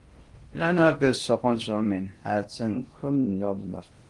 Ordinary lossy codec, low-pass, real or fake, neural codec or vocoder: Opus, 24 kbps; 10.8 kHz; fake; codec, 16 kHz in and 24 kHz out, 0.6 kbps, FocalCodec, streaming, 4096 codes